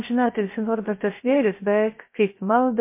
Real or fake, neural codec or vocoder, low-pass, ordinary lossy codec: fake; codec, 16 kHz, 0.3 kbps, FocalCodec; 3.6 kHz; MP3, 24 kbps